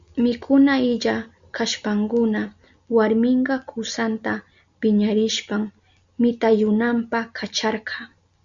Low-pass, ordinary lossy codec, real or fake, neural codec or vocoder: 7.2 kHz; Opus, 64 kbps; real; none